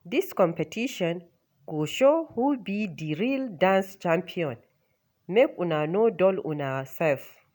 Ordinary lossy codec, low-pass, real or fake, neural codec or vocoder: none; none; real; none